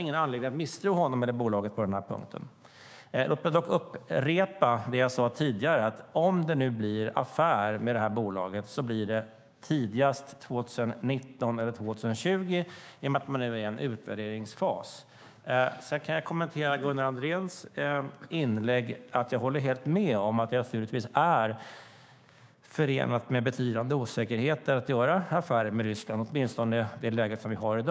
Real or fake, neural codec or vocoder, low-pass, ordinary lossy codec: fake; codec, 16 kHz, 6 kbps, DAC; none; none